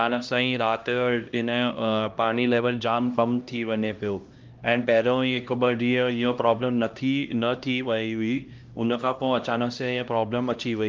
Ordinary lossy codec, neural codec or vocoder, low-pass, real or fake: Opus, 24 kbps; codec, 16 kHz, 1 kbps, X-Codec, HuBERT features, trained on LibriSpeech; 7.2 kHz; fake